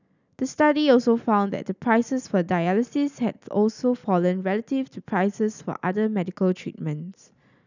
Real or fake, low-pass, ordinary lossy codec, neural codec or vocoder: real; 7.2 kHz; none; none